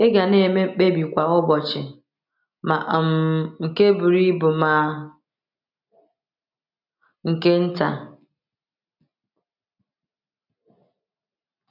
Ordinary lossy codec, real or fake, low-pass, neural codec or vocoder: none; real; 5.4 kHz; none